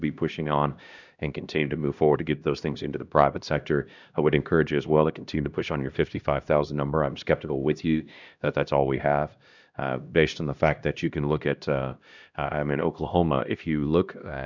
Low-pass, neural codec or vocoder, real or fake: 7.2 kHz; codec, 16 kHz, 1 kbps, X-Codec, HuBERT features, trained on LibriSpeech; fake